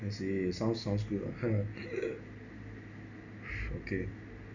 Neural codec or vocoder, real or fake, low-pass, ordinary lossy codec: none; real; 7.2 kHz; none